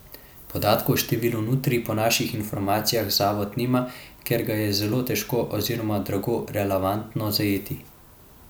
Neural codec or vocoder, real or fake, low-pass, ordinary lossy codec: none; real; none; none